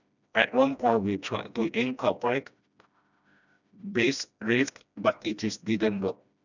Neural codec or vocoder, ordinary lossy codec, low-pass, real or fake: codec, 16 kHz, 1 kbps, FreqCodec, smaller model; none; 7.2 kHz; fake